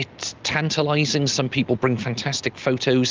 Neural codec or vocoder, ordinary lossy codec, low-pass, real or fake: none; Opus, 24 kbps; 7.2 kHz; real